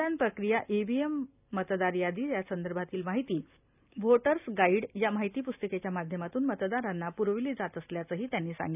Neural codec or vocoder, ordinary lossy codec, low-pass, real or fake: none; none; 3.6 kHz; real